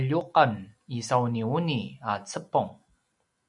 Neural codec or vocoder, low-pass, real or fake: none; 10.8 kHz; real